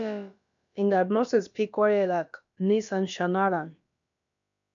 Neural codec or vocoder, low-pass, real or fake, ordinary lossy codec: codec, 16 kHz, about 1 kbps, DyCAST, with the encoder's durations; 7.2 kHz; fake; AAC, 64 kbps